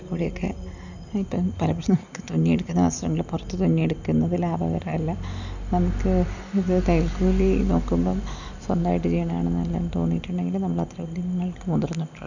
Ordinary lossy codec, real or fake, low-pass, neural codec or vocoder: none; real; 7.2 kHz; none